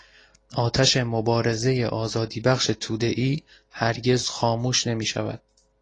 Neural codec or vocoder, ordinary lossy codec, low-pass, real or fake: none; AAC, 32 kbps; 9.9 kHz; real